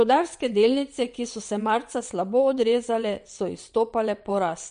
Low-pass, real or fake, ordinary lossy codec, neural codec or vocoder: 9.9 kHz; fake; MP3, 48 kbps; vocoder, 22.05 kHz, 80 mel bands, WaveNeXt